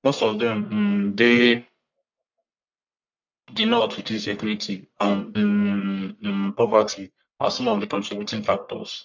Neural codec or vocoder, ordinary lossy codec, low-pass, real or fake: codec, 44.1 kHz, 1.7 kbps, Pupu-Codec; MP3, 64 kbps; 7.2 kHz; fake